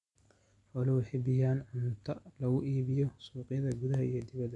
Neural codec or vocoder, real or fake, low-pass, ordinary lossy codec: none; real; 10.8 kHz; MP3, 96 kbps